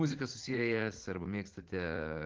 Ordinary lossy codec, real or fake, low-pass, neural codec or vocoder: Opus, 16 kbps; fake; 7.2 kHz; vocoder, 44.1 kHz, 80 mel bands, Vocos